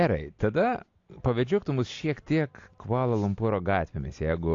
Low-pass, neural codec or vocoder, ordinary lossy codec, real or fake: 7.2 kHz; none; AAC, 48 kbps; real